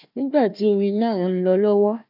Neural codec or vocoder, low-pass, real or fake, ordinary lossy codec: codec, 16 kHz, 1 kbps, FunCodec, trained on Chinese and English, 50 frames a second; 5.4 kHz; fake; none